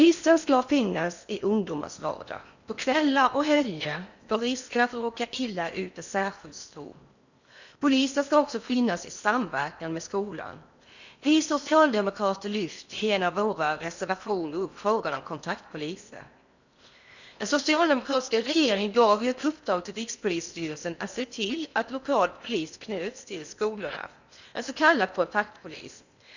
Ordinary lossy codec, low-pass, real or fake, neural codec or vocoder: none; 7.2 kHz; fake; codec, 16 kHz in and 24 kHz out, 0.8 kbps, FocalCodec, streaming, 65536 codes